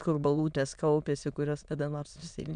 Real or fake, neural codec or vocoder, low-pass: fake; autoencoder, 22.05 kHz, a latent of 192 numbers a frame, VITS, trained on many speakers; 9.9 kHz